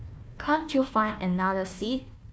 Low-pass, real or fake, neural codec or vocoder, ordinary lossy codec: none; fake; codec, 16 kHz, 1 kbps, FunCodec, trained on Chinese and English, 50 frames a second; none